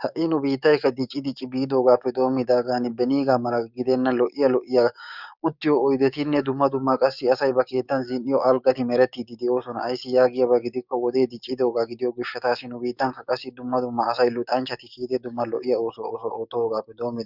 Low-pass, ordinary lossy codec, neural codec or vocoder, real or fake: 5.4 kHz; Opus, 64 kbps; codec, 16 kHz, 6 kbps, DAC; fake